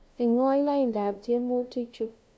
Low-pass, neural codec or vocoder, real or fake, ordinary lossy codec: none; codec, 16 kHz, 0.5 kbps, FunCodec, trained on LibriTTS, 25 frames a second; fake; none